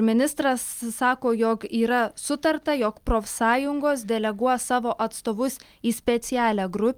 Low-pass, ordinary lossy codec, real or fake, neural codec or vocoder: 19.8 kHz; Opus, 32 kbps; real; none